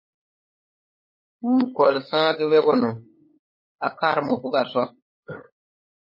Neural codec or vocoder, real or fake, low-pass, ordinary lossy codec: codec, 16 kHz, 8 kbps, FunCodec, trained on LibriTTS, 25 frames a second; fake; 5.4 kHz; MP3, 24 kbps